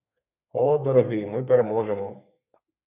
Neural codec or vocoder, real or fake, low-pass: codec, 32 kHz, 1.9 kbps, SNAC; fake; 3.6 kHz